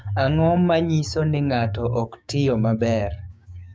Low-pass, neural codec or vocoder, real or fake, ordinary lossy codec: none; codec, 16 kHz, 6 kbps, DAC; fake; none